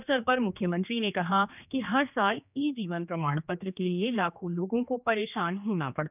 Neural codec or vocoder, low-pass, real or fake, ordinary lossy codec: codec, 16 kHz, 2 kbps, X-Codec, HuBERT features, trained on general audio; 3.6 kHz; fake; none